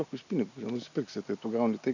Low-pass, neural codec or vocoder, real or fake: 7.2 kHz; none; real